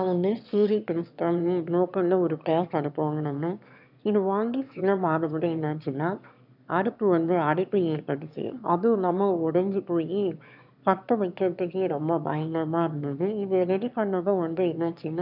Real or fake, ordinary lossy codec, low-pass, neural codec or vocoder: fake; none; 5.4 kHz; autoencoder, 22.05 kHz, a latent of 192 numbers a frame, VITS, trained on one speaker